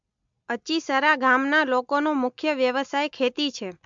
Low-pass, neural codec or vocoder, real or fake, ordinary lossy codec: 7.2 kHz; none; real; MP3, 64 kbps